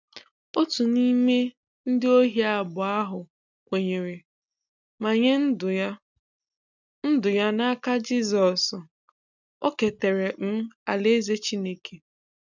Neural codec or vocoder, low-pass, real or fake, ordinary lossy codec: none; 7.2 kHz; real; none